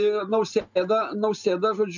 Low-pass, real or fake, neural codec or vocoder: 7.2 kHz; real; none